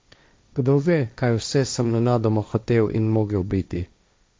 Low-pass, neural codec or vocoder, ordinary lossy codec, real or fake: none; codec, 16 kHz, 1.1 kbps, Voila-Tokenizer; none; fake